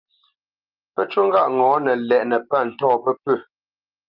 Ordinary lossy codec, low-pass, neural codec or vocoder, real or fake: Opus, 32 kbps; 5.4 kHz; none; real